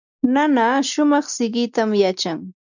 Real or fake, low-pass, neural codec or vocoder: real; 7.2 kHz; none